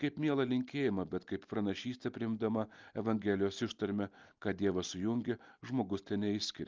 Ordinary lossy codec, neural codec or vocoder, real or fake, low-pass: Opus, 32 kbps; none; real; 7.2 kHz